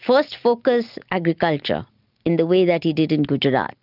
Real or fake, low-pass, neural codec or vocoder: real; 5.4 kHz; none